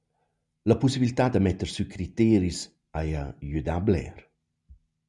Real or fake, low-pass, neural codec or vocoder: real; 10.8 kHz; none